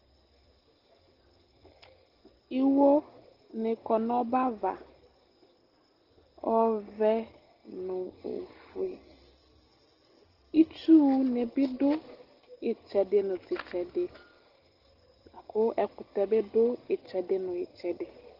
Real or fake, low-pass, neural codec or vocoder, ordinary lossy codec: real; 5.4 kHz; none; Opus, 16 kbps